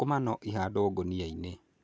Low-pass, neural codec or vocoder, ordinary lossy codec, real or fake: none; none; none; real